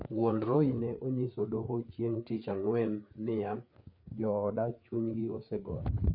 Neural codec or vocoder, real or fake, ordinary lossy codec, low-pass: codec, 16 kHz, 4 kbps, FreqCodec, larger model; fake; none; 5.4 kHz